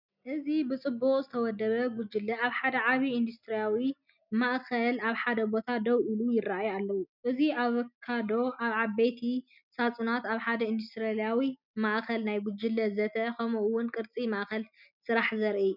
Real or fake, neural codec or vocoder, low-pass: real; none; 5.4 kHz